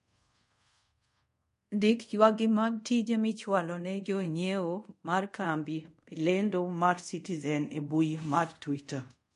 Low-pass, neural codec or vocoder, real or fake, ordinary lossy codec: 10.8 kHz; codec, 24 kHz, 0.5 kbps, DualCodec; fake; MP3, 48 kbps